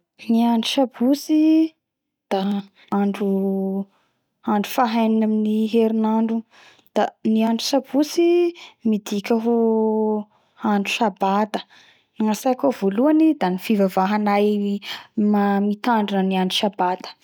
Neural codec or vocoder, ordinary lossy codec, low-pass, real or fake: none; none; 19.8 kHz; real